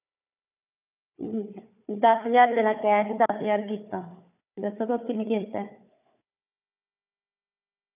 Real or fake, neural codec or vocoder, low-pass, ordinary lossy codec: fake; codec, 16 kHz, 4 kbps, FunCodec, trained on Chinese and English, 50 frames a second; 3.6 kHz; none